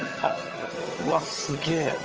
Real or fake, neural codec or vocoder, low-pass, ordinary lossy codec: fake; vocoder, 22.05 kHz, 80 mel bands, HiFi-GAN; 7.2 kHz; Opus, 24 kbps